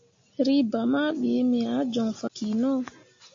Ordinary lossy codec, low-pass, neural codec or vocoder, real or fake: AAC, 48 kbps; 7.2 kHz; none; real